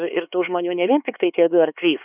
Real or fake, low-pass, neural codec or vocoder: fake; 3.6 kHz; codec, 16 kHz, 4 kbps, X-Codec, HuBERT features, trained on LibriSpeech